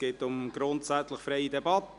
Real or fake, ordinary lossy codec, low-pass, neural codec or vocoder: real; none; 10.8 kHz; none